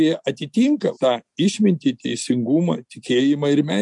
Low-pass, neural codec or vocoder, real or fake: 10.8 kHz; none; real